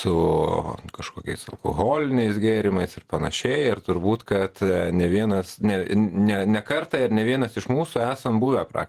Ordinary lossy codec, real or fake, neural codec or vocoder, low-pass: Opus, 24 kbps; fake; vocoder, 48 kHz, 128 mel bands, Vocos; 14.4 kHz